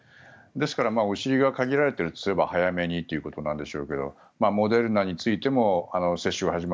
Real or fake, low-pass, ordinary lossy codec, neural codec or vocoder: real; none; none; none